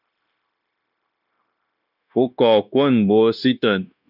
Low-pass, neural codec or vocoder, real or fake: 5.4 kHz; codec, 16 kHz, 0.9 kbps, LongCat-Audio-Codec; fake